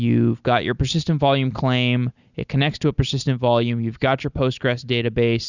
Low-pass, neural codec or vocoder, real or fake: 7.2 kHz; none; real